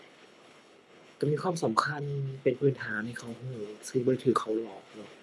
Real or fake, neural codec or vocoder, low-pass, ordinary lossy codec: fake; codec, 24 kHz, 6 kbps, HILCodec; none; none